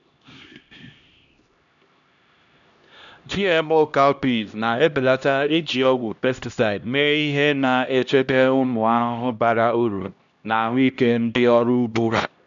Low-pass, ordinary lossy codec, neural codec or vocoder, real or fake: 7.2 kHz; none; codec, 16 kHz, 1 kbps, X-Codec, HuBERT features, trained on LibriSpeech; fake